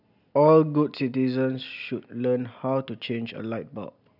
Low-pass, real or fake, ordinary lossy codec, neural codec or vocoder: 5.4 kHz; real; none; none